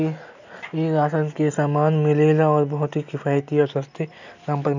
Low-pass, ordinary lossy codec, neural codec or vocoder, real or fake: 7.2 kHz; none; none; real